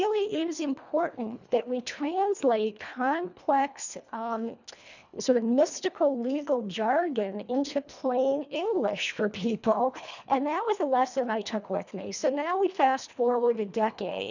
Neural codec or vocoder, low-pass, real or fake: codec, 24 kHz, 1.5 kbps, HILCodec; 7.2 kHz; fake